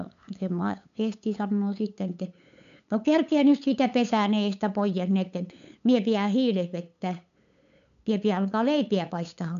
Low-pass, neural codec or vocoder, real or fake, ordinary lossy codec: 7.2 kHz; codec, 16 kHz, 8 kbps, FunCodec, trained on LibriTTS, 25 frames a second; fake; none